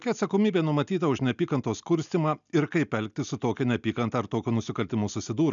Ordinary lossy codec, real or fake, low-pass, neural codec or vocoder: MP3, 96 kbps; real; 7.2 kHz; none